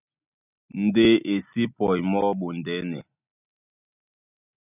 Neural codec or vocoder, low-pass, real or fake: none; 3.6 kHz; real